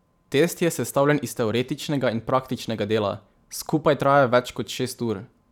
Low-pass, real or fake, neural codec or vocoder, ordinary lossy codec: 19.8 kHz; fake; vocoder, 44.1 kHz, 128 mel bands every 512 samples, BigVGAN v2; none